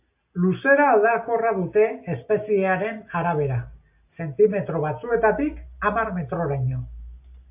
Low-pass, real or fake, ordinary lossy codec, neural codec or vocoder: 3.6 kHz; real; AAC, 32 kbps; none